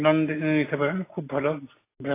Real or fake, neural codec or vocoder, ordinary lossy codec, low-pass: real; none; AAC, 16 kbps; 3.6 kHz